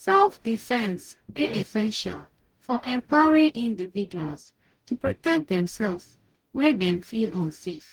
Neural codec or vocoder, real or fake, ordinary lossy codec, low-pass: codec, 44.1 kHz, 0.9 kbps, DAC; fake; Opus, 24 kbps; 19.8 kHz